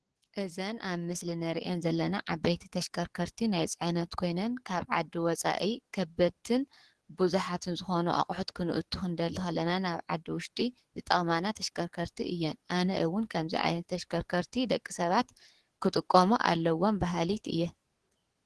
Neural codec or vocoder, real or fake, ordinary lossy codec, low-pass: codec, 44.1 kHz, 7.8 kbps, DAC; fake; Opus, 16 kbps; 10.8 kHz